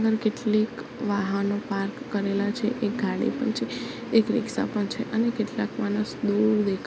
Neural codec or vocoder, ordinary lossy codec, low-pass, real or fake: none; none; none; real